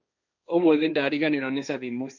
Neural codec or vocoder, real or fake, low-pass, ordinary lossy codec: codec, 16 kHz, 1.1 kbps, Voila-Tokenizer; fake; 7.2 kHz; none